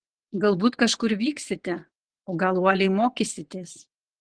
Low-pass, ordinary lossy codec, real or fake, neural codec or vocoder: 9.9 kHz; Opus, 16 kbps; fake; vocoder, 22.05 kHz, 80 mel bands, WaveNeXt